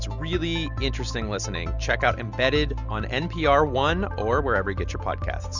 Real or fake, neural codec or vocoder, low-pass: real; none; 7.2 kHz